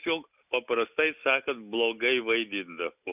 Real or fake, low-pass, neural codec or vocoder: real; 3.6 kHz; none